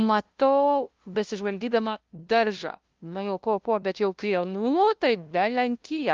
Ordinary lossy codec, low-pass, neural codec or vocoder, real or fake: Opus, 32 kbps; 7.2 kHz; codec, 16 kHz, 0.5 kbps, FunCodec, trained on LibriTTS, 25 frames a second; fake